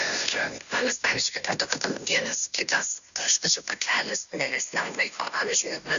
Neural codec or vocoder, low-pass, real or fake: codec, 16 kHz, 0.5 kbps, FunCodec, trained on Chinese and English, 25 frames a second; 7.2 kHz; fake